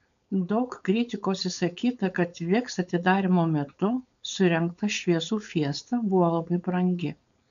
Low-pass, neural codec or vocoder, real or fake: 7.2 kHz; codec, 16 kHz, 4.8 kbps, FACodec; fake